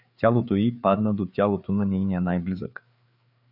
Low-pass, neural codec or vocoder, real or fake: 5.4 kHz; codec, 16 kHz, 4 kbps, FreqCodec, larger model; fake